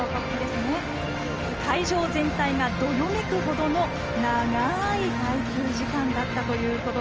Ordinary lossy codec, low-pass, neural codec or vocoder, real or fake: Opus, 24 kbps; 7.2 kHz; none; real